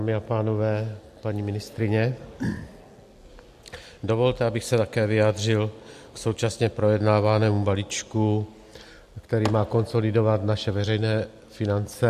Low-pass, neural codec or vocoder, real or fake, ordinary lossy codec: 14.4 kHz; none; real; MP3, 64 kbps